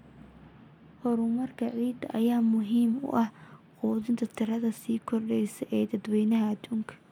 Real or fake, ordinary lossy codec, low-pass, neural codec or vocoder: real; none; 19.8 kHz; none